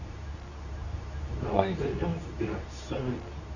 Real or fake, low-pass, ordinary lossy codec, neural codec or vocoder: fake; 7.2 kHz; none; codec, 24 kHz, 0.9 kbps, WavTokenizer, medium speech release version 2